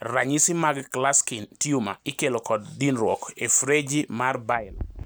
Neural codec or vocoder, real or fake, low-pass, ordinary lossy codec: none; real; none; none